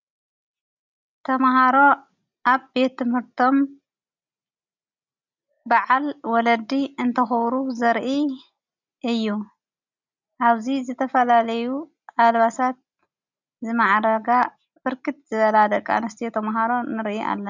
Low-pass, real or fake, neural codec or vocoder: 7.2 kHz; real; none